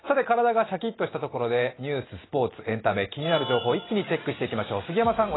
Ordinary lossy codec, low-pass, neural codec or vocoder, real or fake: AAC, 16 kbps; 7.2 kHz; none; real